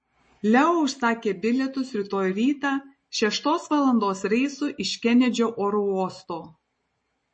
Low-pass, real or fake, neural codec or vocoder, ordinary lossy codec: 9.9 kHz; real; none; MP3, 32 kbps